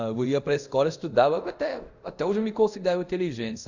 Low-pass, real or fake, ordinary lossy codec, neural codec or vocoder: 7.2 kHz; fake; none; codec, 24 kHz, 0.5 kbps, DualCodec